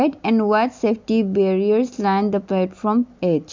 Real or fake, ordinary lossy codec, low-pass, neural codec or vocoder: real; MP3, 64 kbps; 7.2 kHz; none